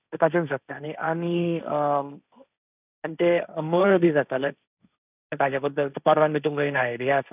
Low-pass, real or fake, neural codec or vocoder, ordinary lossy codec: 3.6 kHz; fake; codec, 16 kHz, 1.1 kbps, Voila-Tokenizer; none